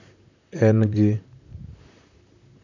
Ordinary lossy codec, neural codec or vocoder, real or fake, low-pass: none; vocoder, 44.1 kHz, 128 mel bands, Pupu-Vocoder; fake; 7.2 kHz